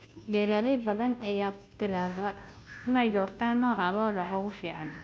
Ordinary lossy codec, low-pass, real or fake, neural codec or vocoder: none; none; fake; codec, 16 kHz, 0.5 kbps, FunCodec, trained on Chinese and English, 25 frames a second